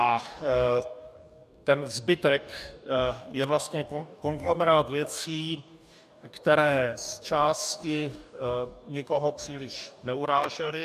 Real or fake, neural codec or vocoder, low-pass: fake; codec, 44.1 kHz, 2.6 kbps, DAC; 14.4 kHz